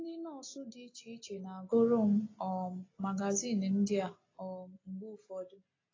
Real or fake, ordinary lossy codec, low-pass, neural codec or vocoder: real; AAC, 32 kbps; 7.2 kHz; none